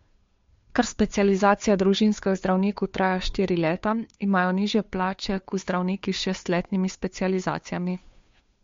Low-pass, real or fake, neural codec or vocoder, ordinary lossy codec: 7.2 kHz; fake; codec, 16 kHz, 2 kbps, FunCodec, trained on Chinese and English, 25 frames a second; MP3, 48 kbps